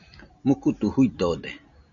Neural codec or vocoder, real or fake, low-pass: none; real; 7.2 kHz